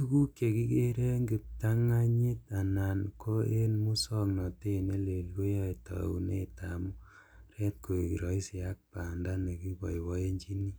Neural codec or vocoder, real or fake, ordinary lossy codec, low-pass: none; real; none; none